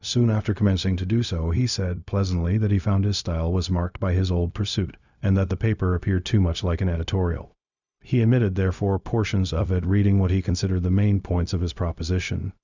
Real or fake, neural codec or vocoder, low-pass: fake; codec, 16 kHz, 0.4 kbps, LongCat-Audio-Codec; 7.2 kHz